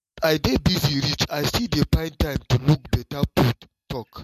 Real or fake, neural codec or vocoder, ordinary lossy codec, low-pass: real; none; MP3, 64 kbps; 14.4 kHz